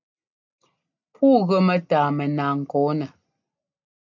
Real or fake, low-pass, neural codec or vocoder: real; 7.2 kHz; none